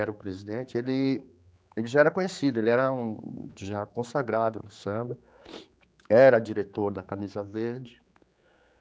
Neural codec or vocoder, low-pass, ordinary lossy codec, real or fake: codec, 16 kHz, 4 kbps, X-Codec, HuBERT features, trained on general audio; none; none; fake